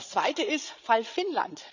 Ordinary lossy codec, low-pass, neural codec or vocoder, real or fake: none; 7.2 kHz; codec, 16 kHz, 16 kbps, FreqCodec, larger model; fake